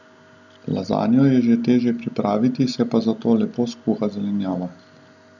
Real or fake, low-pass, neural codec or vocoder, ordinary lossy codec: real; 7.2 kHz; none; none